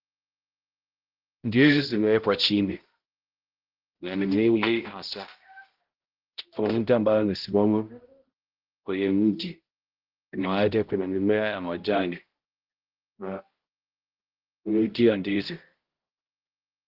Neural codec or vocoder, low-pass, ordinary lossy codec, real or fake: codec, 16 kHz, 0.5 kbps, X-Codec, HuBERT features, trained on balanced general audio; 5.4 kHz; Opus, 16 kbps; fake